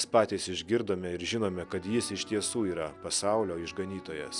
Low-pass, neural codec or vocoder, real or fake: 10.8 kHz; none; real